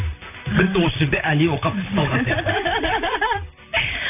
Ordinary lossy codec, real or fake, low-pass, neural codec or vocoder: none; fake; 3.6 kHz; vocoder, 44.1 kHz, 128 mel bands, Pupu-Vocoder